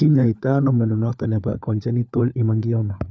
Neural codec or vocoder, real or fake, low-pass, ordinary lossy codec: codec, 16 kHz, 4 kbps, FunCodec, trained on LibriTTS, 50 frames a second; fake; none; none